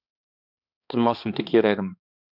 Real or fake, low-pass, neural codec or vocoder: fake; 5.4 kHz; codec, 16 kHz, 2 kbps, X-Codec, HuBERT features, trained on balanced general audio